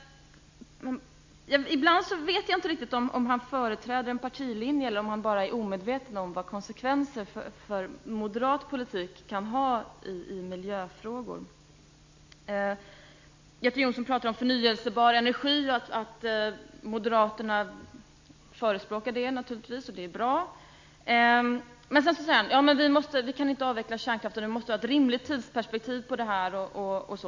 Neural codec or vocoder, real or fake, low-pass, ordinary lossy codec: none; real; 7.2 kHz; MP3, 48 kbps